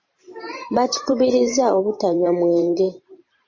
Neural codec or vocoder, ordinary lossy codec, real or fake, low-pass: vocoder, 44.1 kHz, 128 mel bands every 512 samples, BigVGAN v2; MP3, 32 kbps; fake; 7.2 kHz